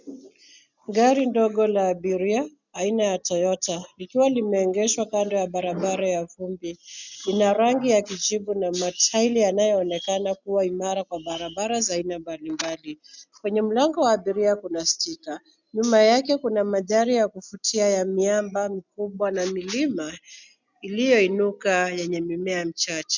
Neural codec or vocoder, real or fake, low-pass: none; real; 7.2 kHz